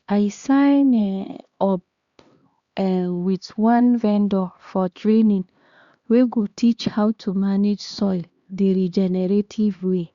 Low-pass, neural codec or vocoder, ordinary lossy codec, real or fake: 7.2 kHz; codec, 16 kHz, 2 kbps, X-Codec, HuBERT features, trained on LibriSpeech; Opus, 64 kbps; fake